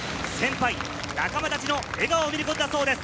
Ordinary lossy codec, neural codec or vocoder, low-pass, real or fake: none; none; none; real